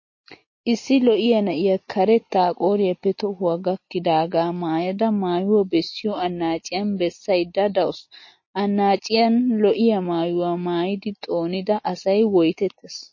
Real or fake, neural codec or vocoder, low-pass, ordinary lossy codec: real; none; 7.2 kHz; MP3, 32 kbps